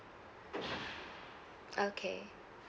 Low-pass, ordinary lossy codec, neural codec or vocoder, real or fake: none; none; none; real